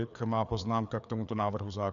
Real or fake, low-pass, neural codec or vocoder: fake; 7.2 kHz; codec, 16 kHz, 4 kbps, FreqCodec, larger model